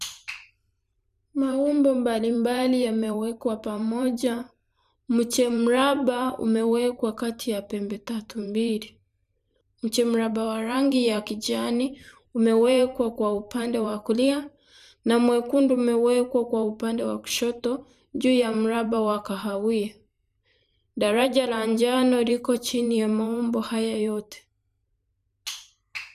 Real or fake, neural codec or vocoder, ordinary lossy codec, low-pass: fake; vocoder, 44.1 kHz, 128 mel bands every 512 samples, BigVGAN v2; none; 14.4 kHz